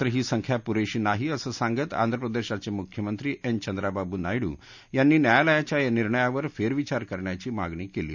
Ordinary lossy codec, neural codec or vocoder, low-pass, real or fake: none; none; 7.2 kHz; real